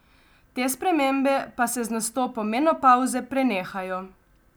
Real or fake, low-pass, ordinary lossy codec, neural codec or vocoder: real; none; none; none